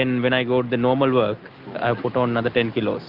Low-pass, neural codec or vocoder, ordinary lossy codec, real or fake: 5.4 kHz; none; Opus, 24 kbps; real